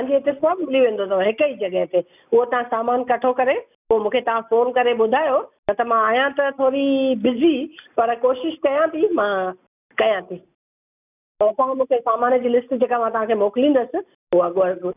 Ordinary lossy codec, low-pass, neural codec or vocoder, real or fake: none; 3.6 kHz; none; real